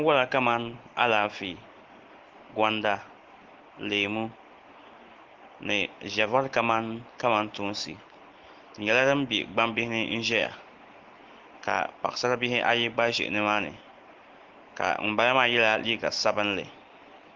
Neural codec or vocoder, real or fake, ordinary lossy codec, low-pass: none; real; Opus, 16 kbps; 7.2 kHz